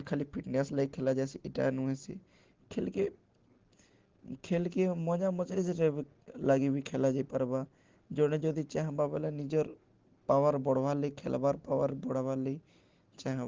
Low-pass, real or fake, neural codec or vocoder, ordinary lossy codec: 7.2 kHz; real; none; Opus, 16 kbps